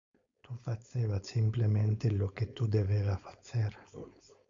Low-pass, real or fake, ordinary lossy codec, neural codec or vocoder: 7.2 kHz; fake; AAC, 64 kbps; codec, 16 kHz, 4.8 kbps, FACodec